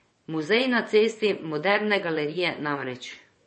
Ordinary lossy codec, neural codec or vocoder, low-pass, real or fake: MP3, 32 kbps; vocoder, 22.05 kHz, 80 mel bands, WaveNeXt; 9.9 kHz; fake